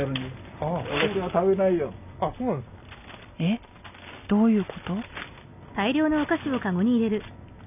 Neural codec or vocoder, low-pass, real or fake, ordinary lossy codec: none; 3.6 kHz; real; none